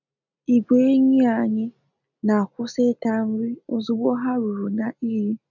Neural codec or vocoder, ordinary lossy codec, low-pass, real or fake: none; none; 7.2 kHz; real